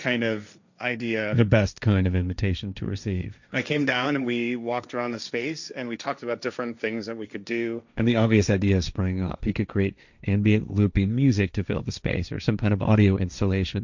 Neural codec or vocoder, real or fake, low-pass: codec, 16 kHz, 1.1 kbps, Voila-Tokenizer; fake; 7.2 kHz